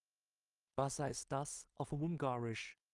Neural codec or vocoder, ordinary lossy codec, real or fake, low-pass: codec, 16 kHz in and 24 kHz out, 0.4 kbps, LongCat-Audio-Codec, two codebook decoder; Opus, 24 kbps; fake; 10.8 kHz